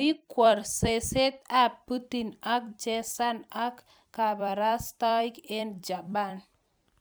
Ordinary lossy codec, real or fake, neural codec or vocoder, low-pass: none; fake; vocoder, 44.1 kHz, 128 mel bands every 256 samples, BigVGAN v2; none